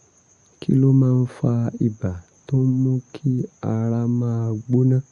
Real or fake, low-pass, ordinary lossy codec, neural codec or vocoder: real; 10.8 kHz; Opus, 64 kbps; none